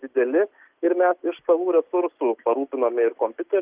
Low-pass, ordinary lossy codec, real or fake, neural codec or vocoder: 3.6 kHz; Opus, 24 kbps; real; none